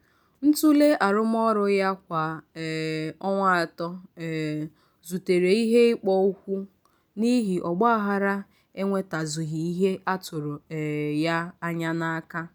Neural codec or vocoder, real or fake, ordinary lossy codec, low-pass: none; real; none; none